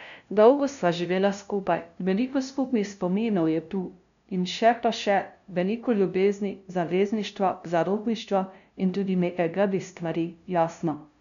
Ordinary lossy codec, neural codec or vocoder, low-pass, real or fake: none; codec, 16 kHz, 0.5 kbps, FunCodec, trained on LibriTTS, 25 frames a second; 7.2 kHz; fake